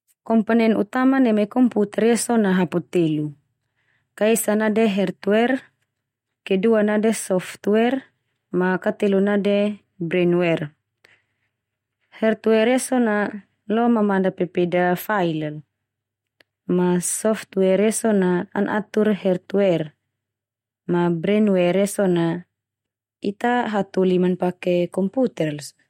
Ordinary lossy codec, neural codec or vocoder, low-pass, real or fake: MP3, 64 kbps; none; 19.8 kHz; real